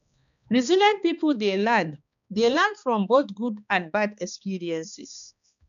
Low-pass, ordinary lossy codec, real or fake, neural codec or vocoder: 7.2 kHz; none; fake; codec, 16 kHz, 2 kbps, X-Codec, HuBERT features, trained on balanced general audio